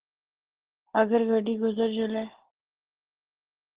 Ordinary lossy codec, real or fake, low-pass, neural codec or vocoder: Opus, 16 kbps; real; 3.6 kHz; none